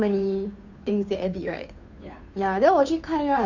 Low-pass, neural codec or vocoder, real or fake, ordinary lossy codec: 7.2 kHz; codec, 16 kHz, 2 kbps, FunCodec, trained on Chinese and English, 25 frames a second; fake; none